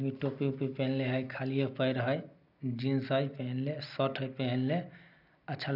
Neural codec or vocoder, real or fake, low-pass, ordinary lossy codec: none; real; 5.4 kHz; none